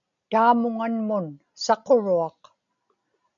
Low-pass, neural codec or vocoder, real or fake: 7.2 kHz; none; real